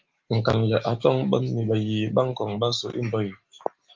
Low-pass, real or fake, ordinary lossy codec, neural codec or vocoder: 7.2 kHz; real; Opus, 24 kbps; none